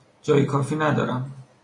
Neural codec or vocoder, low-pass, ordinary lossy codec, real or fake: none; 10.8 kHz; MP3, 48 kbps; real